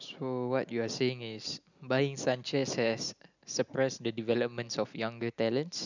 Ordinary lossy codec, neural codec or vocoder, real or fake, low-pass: none; none; real; 7.2 kHz